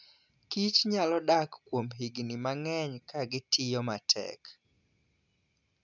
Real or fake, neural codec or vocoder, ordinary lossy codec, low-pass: real; none; none; 7.2 kHz